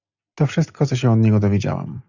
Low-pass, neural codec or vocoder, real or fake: 7.2 kHz; none; real